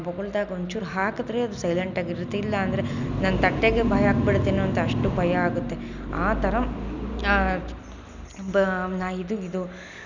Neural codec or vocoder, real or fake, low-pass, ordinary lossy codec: none; real; 7.2 kHz; none